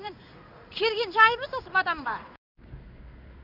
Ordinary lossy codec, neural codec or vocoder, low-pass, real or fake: none; codec, 16 kHz in and 24 kHz out, 1 kbps, XY-Tokenizer; 5.4 kHz; fake